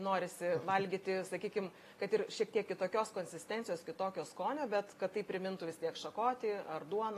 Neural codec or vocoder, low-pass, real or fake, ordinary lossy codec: none; 14.4 kHz; real; AAC, 48 kbps